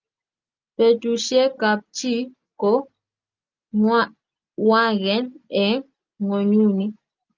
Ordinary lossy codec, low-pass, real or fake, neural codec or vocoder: Opus, 24 kbps; 7.2 kHz; real; none